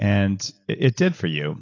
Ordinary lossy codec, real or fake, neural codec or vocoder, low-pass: AAC, 32 kbps; real; none; 7.2 kHz